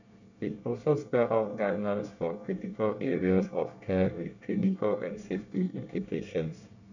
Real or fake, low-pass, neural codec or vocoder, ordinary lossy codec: fake; 7.2 kHz; codec, 24 kHz, 1 kbps, SNAC; none